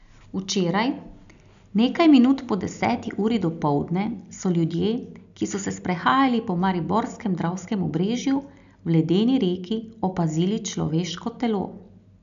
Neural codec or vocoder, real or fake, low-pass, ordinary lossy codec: none; real; 7.2 kHz; none